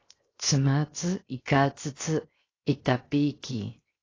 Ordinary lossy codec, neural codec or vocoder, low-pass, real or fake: AAC, 32 kbps; codec, 16 kHz, 0.7 kbps, FocalCodec; 7.2 kHz; fake